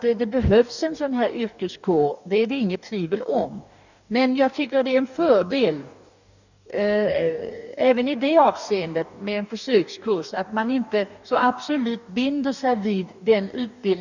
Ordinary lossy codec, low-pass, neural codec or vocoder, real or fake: none; 7.2 kHz; codec, 44.1 kHz, 2.6 kbps, DAC; fake